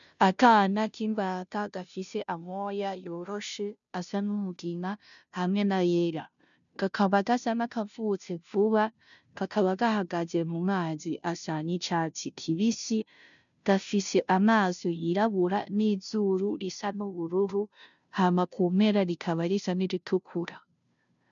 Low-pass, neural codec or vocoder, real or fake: 7.2 kHz; codec, 16 kHz, 0.5 kbps, FunCodec, trained on Chinese and English, 25 frames a second; fake